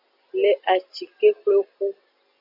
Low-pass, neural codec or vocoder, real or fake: 5.4 kHz; none; real